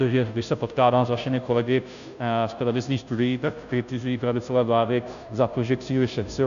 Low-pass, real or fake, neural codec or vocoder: 7.2 kHz; fake; codec, 16 kHz, 0.5 kbps, FunCodec, trained on Chinese and English, 25 frames a second